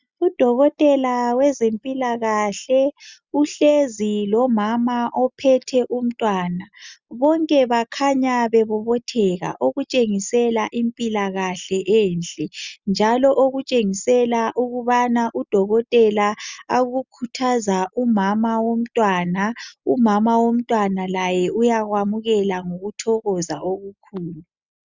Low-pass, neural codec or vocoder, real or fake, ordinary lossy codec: 7.2 kHz; none; real; Opus, 64 kbps